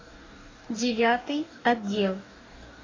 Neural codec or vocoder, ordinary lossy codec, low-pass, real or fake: codec, 44.1 kHz, 2.6 kbps, DAC; AAC, 32 kbps; 7.2 kHz; fake